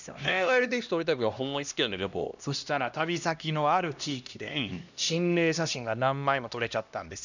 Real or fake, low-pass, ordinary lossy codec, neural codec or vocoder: fake; 7.2 kHz; none; codec, 16 kHz, 1 kbps, X-Codec, HuBERT features, trained on LibriSpeech